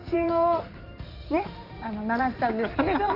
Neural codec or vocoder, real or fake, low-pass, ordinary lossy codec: codec, 16 kHz in and 24 kHz out, 2.2 kbps, FireRedTTS-2 codec; fake; 5.4 kHz; none